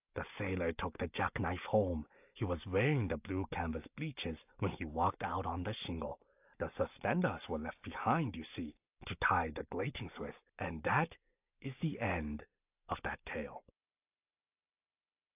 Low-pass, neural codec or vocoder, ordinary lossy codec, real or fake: 3.6 kHz; none; AAC, 32 kbps; real